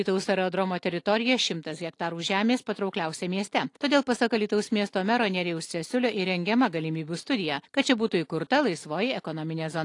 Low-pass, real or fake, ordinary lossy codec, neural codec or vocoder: 10.8 kHz; real; AAC, 48 kbps; none